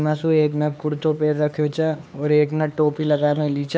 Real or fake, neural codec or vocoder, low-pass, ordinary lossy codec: fake; codec, 16 kHz, 4 kbps, X-Codec, HuBERT features, trained on LibriSpeech; none; none